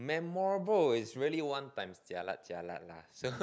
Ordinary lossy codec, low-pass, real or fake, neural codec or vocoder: none; none; real; none